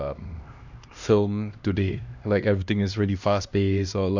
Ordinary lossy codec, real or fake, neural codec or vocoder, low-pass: none; fake; codec, 16 kHz, 1 kbps, X-Codec, HuBERT features, trained on LibriSpeech; 7.2 kHz